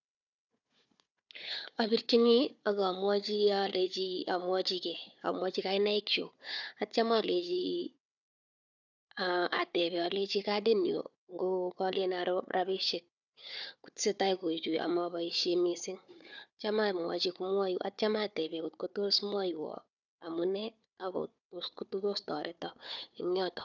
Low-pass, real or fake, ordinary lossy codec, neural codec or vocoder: 7.2 kHz; fake; none; codec, 16 kHz, 4 kbps, FunCodec, trained on Chinese and English, 50 frames a second